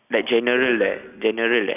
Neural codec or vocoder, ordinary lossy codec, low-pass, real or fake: none; none; 3.6 kHz; real